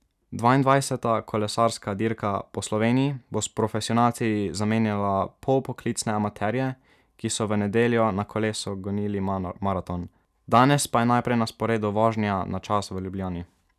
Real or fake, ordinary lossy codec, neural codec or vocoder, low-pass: real; none; none; 14.4 kHz